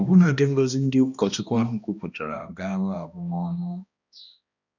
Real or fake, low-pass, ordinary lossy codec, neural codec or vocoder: fake; 7.2 kHz; none; codec, 16 kHz, 1 kbps, X-Codec, HuBERT features, trained on balanced general audio